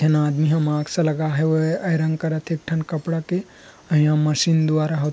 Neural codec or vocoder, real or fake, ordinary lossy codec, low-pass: none; real; none; none